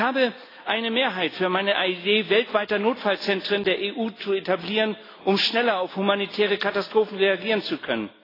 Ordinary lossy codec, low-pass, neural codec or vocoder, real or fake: AAC, 24 kbps; 5.4 kHz; none; real